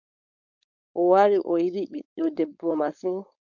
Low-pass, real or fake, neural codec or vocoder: 7.2 kHz; fake; codec, 16 kHz, 4.8 kbps, FACodec